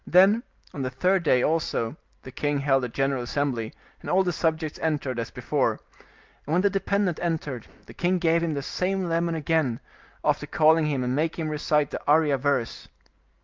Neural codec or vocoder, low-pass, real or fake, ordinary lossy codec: none; 7.2 kHz; real; Opus, 24 kbps